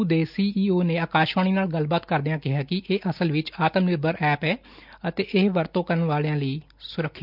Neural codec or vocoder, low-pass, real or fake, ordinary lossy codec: none; 5.4 kHz; real; none